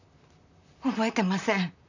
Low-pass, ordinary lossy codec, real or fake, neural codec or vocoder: 7.2 kHz; none; fake; vocoder, 44.1 kHz, 128 mel bands, Pupu-Vocoder